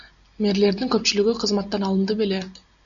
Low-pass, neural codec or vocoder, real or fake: 7.2 kHz; none; real